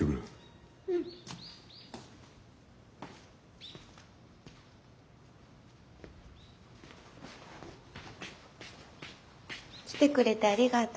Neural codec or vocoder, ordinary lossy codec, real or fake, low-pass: none; none; real; none